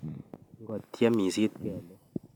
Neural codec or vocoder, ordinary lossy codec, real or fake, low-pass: none; none; real; 19.8 kHz